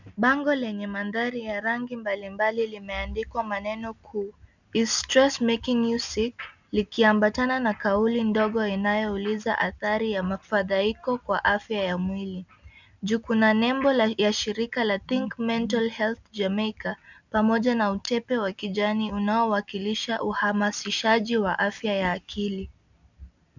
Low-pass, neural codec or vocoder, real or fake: 7.2 kHz; none; real